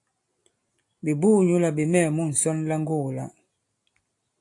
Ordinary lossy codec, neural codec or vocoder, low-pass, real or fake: AAC, 64 kbps; none; 10.8 kHz; real